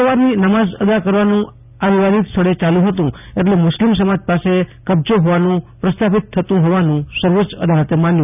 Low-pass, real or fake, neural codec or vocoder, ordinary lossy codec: 3.6 kHz; real; none; none